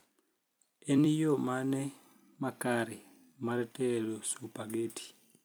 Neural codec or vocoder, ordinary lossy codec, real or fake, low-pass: vocoder, 44.1 kHz, 128 mel bands every 256 samples, BigVGAN v2; none; fake; none